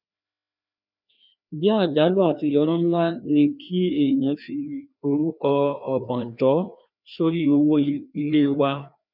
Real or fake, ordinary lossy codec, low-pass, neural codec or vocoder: fake; none; 5.4 kHz; codec, 16 kHz, 2 kbps, FreqCodec, larger model